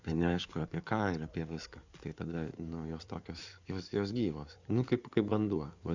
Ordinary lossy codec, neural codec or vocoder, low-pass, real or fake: Opus, 64 kbps; codec, 16 kHz in and 24 kHz out, 2.2 kbps, FireRedTTS-2 codec; 7.2 kHz; fake